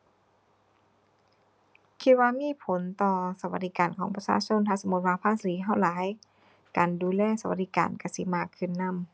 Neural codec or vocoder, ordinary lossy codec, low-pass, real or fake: none; none; none; real